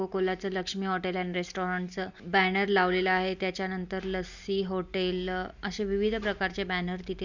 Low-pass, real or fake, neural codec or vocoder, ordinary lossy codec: 7.2 kHz; real; none; none